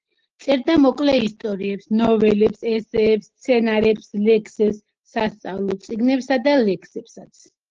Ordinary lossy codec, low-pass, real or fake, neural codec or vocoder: Opus, 16 kbps; 7.2 kHz; real; none